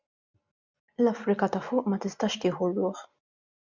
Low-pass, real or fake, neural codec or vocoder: 7.2 kHz; real; none